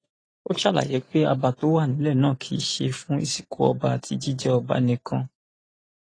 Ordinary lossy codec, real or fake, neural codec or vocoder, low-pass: AAC, 32 kbps; real; none; 9.9 kHz